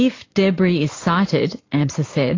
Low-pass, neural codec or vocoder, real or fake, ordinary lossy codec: 7.2 kHz; vocoder, 44.1 kHz, 128 mel bands every 512 samples, BigVGAN v2; fake; AAC, 32 kbps